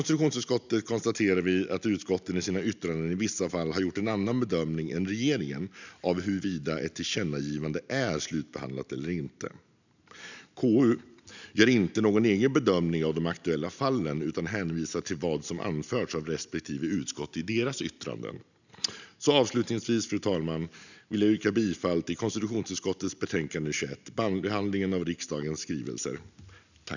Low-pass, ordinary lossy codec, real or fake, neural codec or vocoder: 7.2 kHz; none; real; none